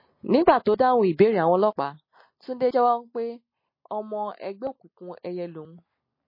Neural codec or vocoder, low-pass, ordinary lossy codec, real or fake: none; 5.4 kHz; MP3, 24 kbps; real